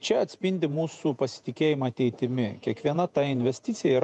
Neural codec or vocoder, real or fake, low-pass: vocoder, 48 kHz, 128 mel bands, Vocos; fake; 9.9 kHz